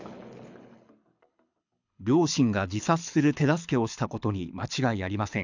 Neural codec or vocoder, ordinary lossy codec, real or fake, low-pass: codec, 24 kHz, 6 kbps, HILCodec; none; fake; 7.2 kHz